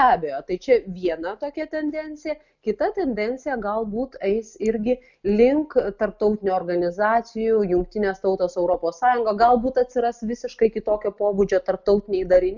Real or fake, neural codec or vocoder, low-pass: real; none; 7.2 kHz